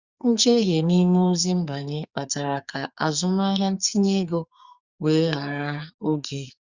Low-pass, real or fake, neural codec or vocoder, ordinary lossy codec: 7.2 kHz; fake; codec, 44.1 kHz, 2.6 kbps, SNAC; Opus, 64 kbps